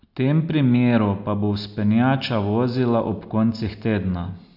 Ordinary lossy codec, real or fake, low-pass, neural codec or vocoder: AAC, 48 kbps; real; 5.4 kHz; none